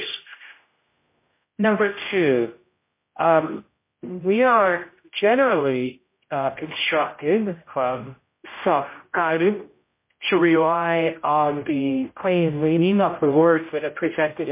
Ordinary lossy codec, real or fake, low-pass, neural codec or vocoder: MP3, 24 kbps; fake; 3.6 kHz; codec, 16 kHz, 0.5 kbps, X-Codec, HuBERT features, trained on general audio